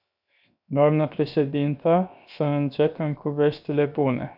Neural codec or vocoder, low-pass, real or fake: codec, 16 kHz, 0.7 kbps, FocalCodec; 5.4 kHz; fake